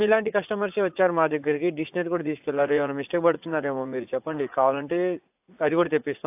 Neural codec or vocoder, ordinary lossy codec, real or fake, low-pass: vocoder, 44.1 kHz, 80 mel bands, Vocos; AAC, 32 kbps; fake; 3.6 kHz